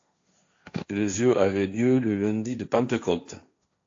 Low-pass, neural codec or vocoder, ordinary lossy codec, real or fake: 7.2 kHz; codec, 16 kHz, 1.1 kbps, Voila-Tokenizer; AAC, 48 kbps; fake